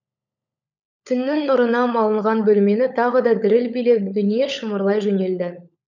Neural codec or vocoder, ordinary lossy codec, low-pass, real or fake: codec, 16 kHz, 16 kbps, FunCodec, trained on LibriTTS, 50 frames a second; none; 7.2 kHz; fake